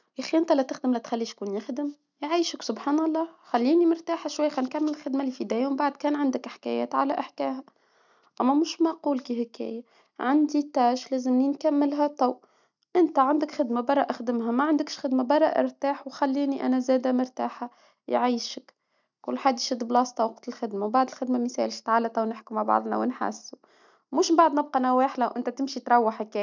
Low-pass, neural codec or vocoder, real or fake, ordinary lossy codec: 7.2 kHz; none; real; none